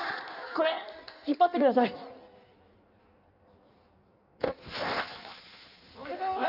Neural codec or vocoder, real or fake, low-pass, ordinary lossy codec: codec, 16 kHz in and 24 kHz out, 1.1 kbps, FireRedTTS-2 codec; fake; 5.4 kHz; none